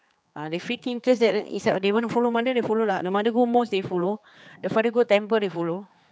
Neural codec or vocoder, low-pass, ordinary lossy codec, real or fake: codec, 16 kHz, 4 kbps, X-Codec, HuBERT features, trained on general audio; none; none; fake